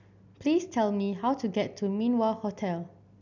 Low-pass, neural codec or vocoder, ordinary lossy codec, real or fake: 7.2 kHz; none; none; real